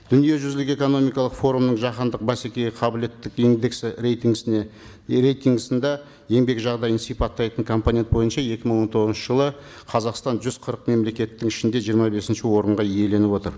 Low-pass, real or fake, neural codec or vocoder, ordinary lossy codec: none; real; none; none